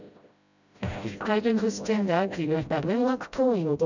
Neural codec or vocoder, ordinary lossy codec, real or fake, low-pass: codec, 16 kHz, 0.5 kbps, FreqCodec, smaller model; none; fake; 7.2 kHz